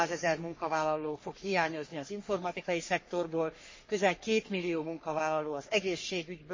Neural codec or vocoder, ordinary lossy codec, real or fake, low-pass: codec, 44.1 kHz, 3.4 kbps, Pupu-Codec; MP3, 32 kbps; fake; 7.2 kHz